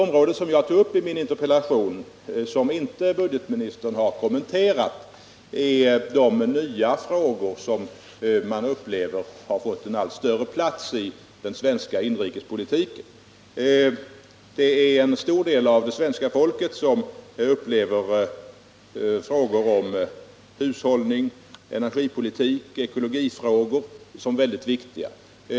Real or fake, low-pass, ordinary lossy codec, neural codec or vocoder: real; none; none; none